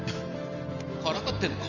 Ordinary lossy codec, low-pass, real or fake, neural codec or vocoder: none; 7.2 kHz; fake; vocoder, 44.1 kHz, 128 mel bands every 512 samples, BigVGAN v2